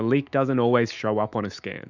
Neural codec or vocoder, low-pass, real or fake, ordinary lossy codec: autoencoder, 48 kHz, 128 numbers a frame, DAC-VAE, trained on Japanese speech; 7.2 kHz; fake; Opus, 64 kbps